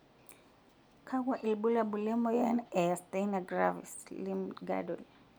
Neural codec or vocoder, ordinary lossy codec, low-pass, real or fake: none; none; none; real